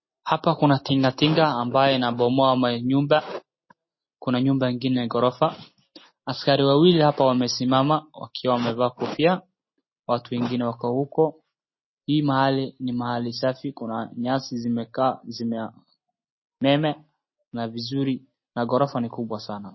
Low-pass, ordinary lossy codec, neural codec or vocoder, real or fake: 7.2 kHz; MP3, 24 kbps; none; real